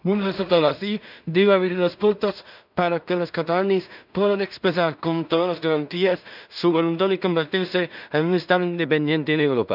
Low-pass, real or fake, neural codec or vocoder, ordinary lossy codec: 5.4 kHz; fake; codec, 16 kHz in and 24 kHz out, 0.4 kbps, LongCat-Audio-Codec, two codebook decoder; none